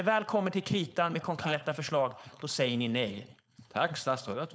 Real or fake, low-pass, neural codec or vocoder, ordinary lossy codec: fake; none; codec, 16 kHz, 4.8 kbps, FACodec; none